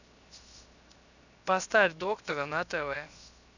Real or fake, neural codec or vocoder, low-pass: fake; codec, 16 kHz, 0.7 kbps, FocalCodec; 7.2 kHz